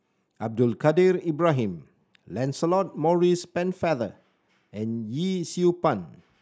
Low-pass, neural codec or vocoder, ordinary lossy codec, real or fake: none; none; none; real